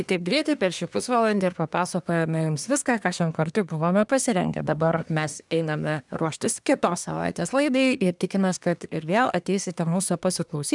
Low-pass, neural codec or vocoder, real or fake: 10.8 kHz; codec, 24 kHz, 1 kbps, SNAC; fake